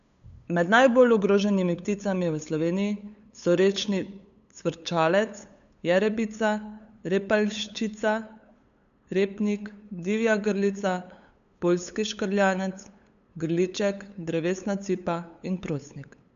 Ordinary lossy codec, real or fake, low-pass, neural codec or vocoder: none; fake; 7.2 kHz; codec, 16 kHz, 8 kbps, FunCodec, trained on LibriTTS, 25 frames a second